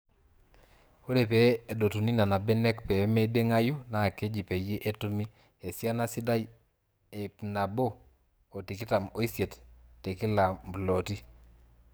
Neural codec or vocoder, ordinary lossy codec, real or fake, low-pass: codec, 44.1 kHz, 7.8 kbps, DAC; none; fake; none